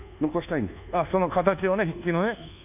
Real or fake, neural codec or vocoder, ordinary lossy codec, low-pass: fake; codec, 24 kHz, 1.2 kbps, DualCodec; none; 3.6 kHz